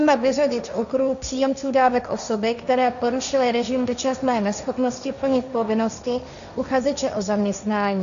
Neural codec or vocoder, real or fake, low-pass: codec, 16 kHz, 1.1 kbps, Voila-Tokenizer; fake; 7.2 kHz